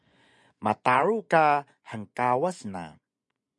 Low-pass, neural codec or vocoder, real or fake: 10.8 kHz; vocoder, 24 kHz, 100 mel bands, Vocos; fake